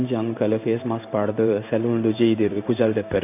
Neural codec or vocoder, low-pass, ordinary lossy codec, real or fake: codec, 16 kHz in and 24 kHz out, 1 kbps, XY-Tokenizer; 3.6 kHz; none; fake